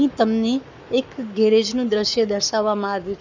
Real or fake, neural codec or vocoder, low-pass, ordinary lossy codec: fake; codec, 44.1 kHz, 7.8 kbps, Pupu-Codec; 7.2 kHz; none